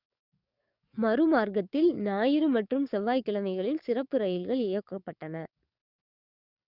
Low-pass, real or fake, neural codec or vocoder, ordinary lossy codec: 5.4 kHz; fake; codec, 44.1 kHz, 7.8 kbps, DAC; Opus, 64 kbps